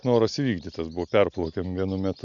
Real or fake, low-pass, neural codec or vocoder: real; 7.2 kHz; none